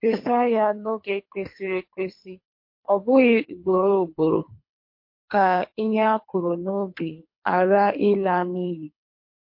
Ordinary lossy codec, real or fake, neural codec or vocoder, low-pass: MP3, 32 kbps; fake; codec, 24 kHz, 3 kbps, HILCodec; 5.4 kHz